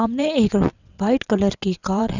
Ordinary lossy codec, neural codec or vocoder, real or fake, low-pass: none; vocoder, 22.05 kHz, 80 mel bands, WaveNeXt; fake; 7.2 kHz